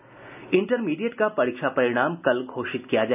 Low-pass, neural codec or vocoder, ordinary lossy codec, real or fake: 3.6 kHz; none; none; real